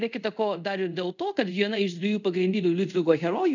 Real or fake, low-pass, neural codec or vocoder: fake; 7.2 kHz; codec, 24 kHz, 0.5 kbps, DualCodec